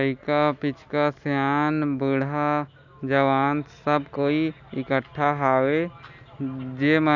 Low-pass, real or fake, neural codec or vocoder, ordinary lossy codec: 7.2 kHz; real; none; none